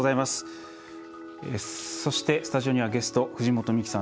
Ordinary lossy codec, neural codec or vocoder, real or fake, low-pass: none; none; real; none